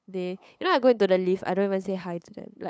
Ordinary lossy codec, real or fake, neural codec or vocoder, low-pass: none; fake; codec, 16 kHz, 8 kbps, FunCodec, trained on LibriTTS, 25 frames a second; none